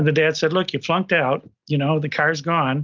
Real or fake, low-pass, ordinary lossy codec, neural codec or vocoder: real; 7.2 kHz; Opus, 32 kbps; none